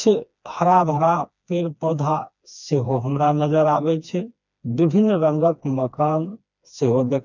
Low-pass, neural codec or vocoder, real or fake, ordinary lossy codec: 7.2 kHz; codec, 16 kHz, 2 kbps, FreqCodec, smaller model; fake; none